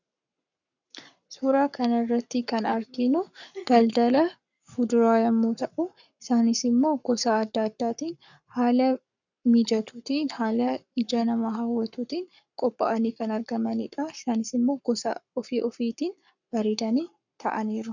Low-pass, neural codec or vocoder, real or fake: 7.2 kHz; codec, 44.1 kHz, 7.8 kbps, Pupu-Codec; fake